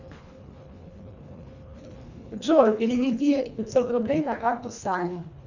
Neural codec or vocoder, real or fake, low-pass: codec, 24 kHz, 1.5 kbps, HILCodec; fake; 7.2 kHz